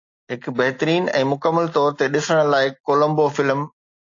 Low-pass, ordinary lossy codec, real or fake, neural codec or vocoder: 7.2 kHz; AAC, 48 kbps; real; none